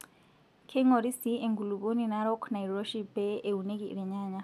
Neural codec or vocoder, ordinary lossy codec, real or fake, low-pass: none; none; real; 14.4 kHz